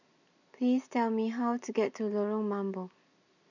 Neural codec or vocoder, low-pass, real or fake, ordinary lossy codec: none; 7.2 kHz; real; none